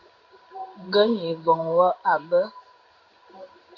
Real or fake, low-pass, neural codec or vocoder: fake; 7.2 kHz; codec, 16 kHz in and 24 kHz out, 1 kbps, XY-Tokenizer